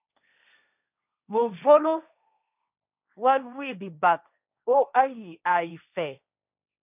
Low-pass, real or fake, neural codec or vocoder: 3.6 kHz; fake; codec, 16 kHz, 1.1 kbps, Voila-Tokenizer